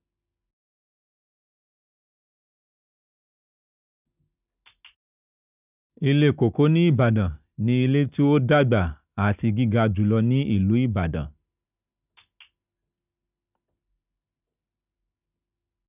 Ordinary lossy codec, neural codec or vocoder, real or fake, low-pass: none; none; real; 3.6 kHz